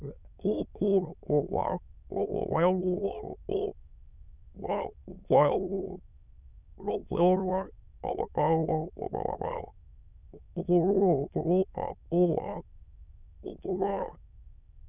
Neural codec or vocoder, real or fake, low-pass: autoencoder, 22.05 kHz, a latent of 192 numbers a frame, VITS, trained on many speakers; fake; 3.6 kHz